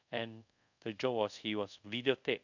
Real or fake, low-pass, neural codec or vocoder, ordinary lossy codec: fake; 7.2 kHz; codec, 24 kHz, 0.5 kbps, DualCodec; none